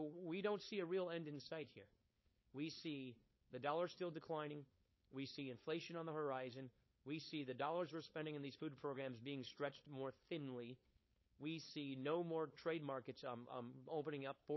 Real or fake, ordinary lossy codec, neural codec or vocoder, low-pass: fake; MP3, 24 kbps; codec, 16 kHz, 4.8 kbps, FACodec; 7.2 kHz